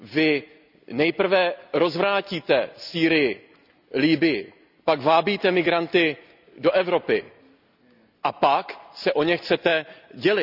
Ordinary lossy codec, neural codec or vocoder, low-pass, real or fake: none; none; 5.4 kHz; real